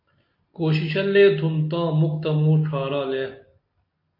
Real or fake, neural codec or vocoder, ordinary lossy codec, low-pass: real; none; MP3, 32 kbps; 5.4 kHz